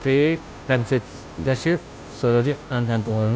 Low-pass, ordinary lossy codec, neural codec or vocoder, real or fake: none; none; codec, 16 kHz, 0.5 kbps, FunCodec, trained on Chinese and English, 25 frames a second; fake